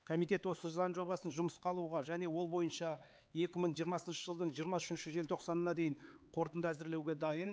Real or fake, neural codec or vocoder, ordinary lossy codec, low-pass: fake; codec, 16 kHz, 4 kbps, X-Codec, HuBERT features, trained on LibriSpeech; none; none